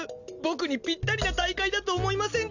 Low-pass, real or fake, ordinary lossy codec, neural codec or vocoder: 7.2 kHz; real; none; none